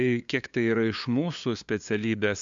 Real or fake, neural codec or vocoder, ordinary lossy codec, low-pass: fake; codec, 16 kHz, 2 kbps, FunCodec, trained on LibriTTS, 25 frames a second; MP3, 64 kbps; 7.2 kHz